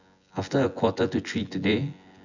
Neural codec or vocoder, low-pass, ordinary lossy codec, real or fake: vocoder, 24 kHz, 100 mel bands, Vocos; 7.2 kHz; none; fake